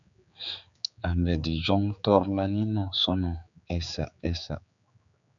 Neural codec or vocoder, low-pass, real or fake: codec, 16 kHz, 4 kbps, X-Codec, HuBERT features, trained on general audio; 7.2 kHz; fake